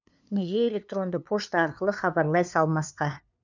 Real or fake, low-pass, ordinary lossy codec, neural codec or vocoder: fake; 7.2 kHz; none; codec, 16 kHz, 2 kbps, FunCodec, trained on LibriTTS, 25 frames a second